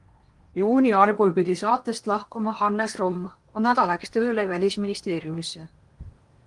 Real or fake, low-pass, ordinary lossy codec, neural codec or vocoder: fake; 10.8 kHz; Opus, 24 kbps; codec, 16 kHz in and 24 kHz out, 0.8 kbps, FocalCodec, streaming, 65536 codes